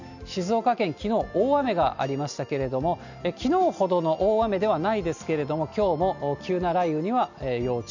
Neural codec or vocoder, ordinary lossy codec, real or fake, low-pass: none; none; real; 7.2 kHz